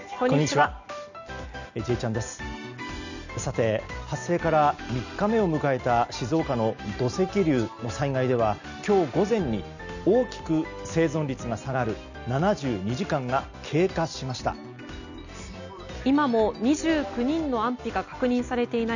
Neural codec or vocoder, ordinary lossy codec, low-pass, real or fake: none; none; 7.2 kHz; real